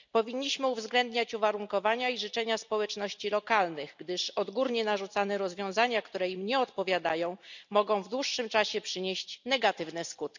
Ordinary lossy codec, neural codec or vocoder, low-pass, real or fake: none; none; 7.2 kHz; real